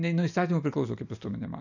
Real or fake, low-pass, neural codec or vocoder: real; 7.2 kHz; none